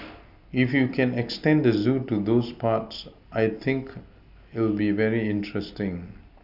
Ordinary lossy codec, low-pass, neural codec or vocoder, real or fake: none; 5.4 kHz; none; real